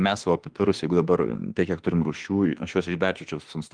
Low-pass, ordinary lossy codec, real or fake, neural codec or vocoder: 9.9 kHz; Opus, 24 kbps; fake; autoencoder, 48 kHz, 32 numbers a frame, DAC-VAE, trained on Japanese speech